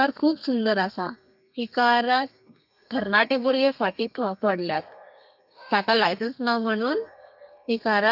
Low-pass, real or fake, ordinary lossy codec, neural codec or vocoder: 5.4 kHz; fake; none; codec, 32 kHz, 1.9 kbps, SNAC